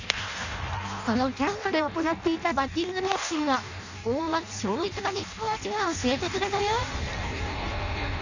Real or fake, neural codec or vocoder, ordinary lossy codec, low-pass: fake; codec, 16 kHz in and 24 kHz out, 0.6 kbps, FireRedTTS-2 codec; none; 7.2 kHz